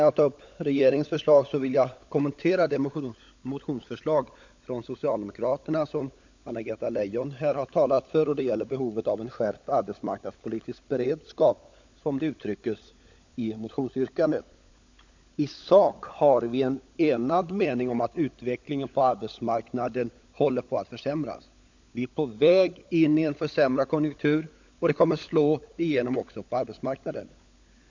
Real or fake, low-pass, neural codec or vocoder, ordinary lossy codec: fake; 7.2 kHz; codec, 16 kHz, 16 kbps, FunCodec, trained on LibriTTS, 50 frames a second; MP3, 64 kbps